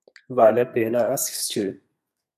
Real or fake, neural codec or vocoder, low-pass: fake; codec, 32 kHz, 1.9 kbps, SNAC; 14.4 kHz